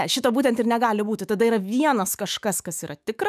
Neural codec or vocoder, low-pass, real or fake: autoencoder, 48 kHz, 128 numbers a frame, DAC-VAE, trained on Japanese speech; 14.4 kHz; fake